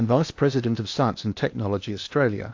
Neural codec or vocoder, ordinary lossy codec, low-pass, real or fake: codec, 16 kHz in and 24 kHz out, 0.8 kbps, FocalCodec, streaming, 65536 codes; AAC, 48 kbps; 7.2 kHz; fake